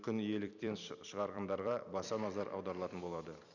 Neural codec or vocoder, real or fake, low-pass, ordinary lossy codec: vocoder, 44.1 kHz, 128 mel bands every 256 samples, BigVGAN v2; fake; 7.2 kHz; none